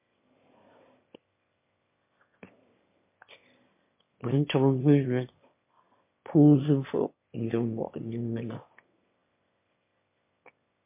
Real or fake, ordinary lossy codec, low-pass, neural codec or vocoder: fake; MP3, 24 kbps; 3.6 kHz; autoencoder, 22.05 kHz, a latent of 192 numbers a frame, VITS, trained on one speaker